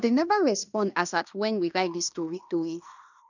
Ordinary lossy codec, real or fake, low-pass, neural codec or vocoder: none; fake; 7.2 kHz; codec, 16 kHz in and 24 kHz out, 0.9 kbps, LongCat-Audio-Codec, fine tuned four codebook decoder